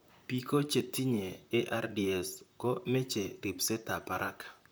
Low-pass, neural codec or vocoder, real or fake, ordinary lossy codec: none; vocoder, 44.1 kHz, 128 mel bands, Pupu-Vocoder; fake; none